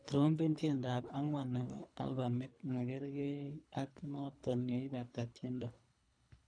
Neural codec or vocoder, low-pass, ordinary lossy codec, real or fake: codec, 24 kHz, 3 kbps, HILCodec; 9.9 kHz; none; fake